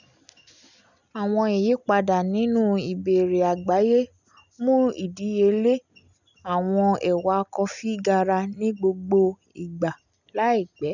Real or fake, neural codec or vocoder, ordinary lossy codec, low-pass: real; none; none; 7.2 kHz